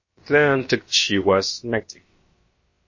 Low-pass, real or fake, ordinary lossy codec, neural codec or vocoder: 7.2 kHz; fake; MP3, 32 kbps; codec, 16 kHz, about 1 kbps, DyCAST, with the encoder's durations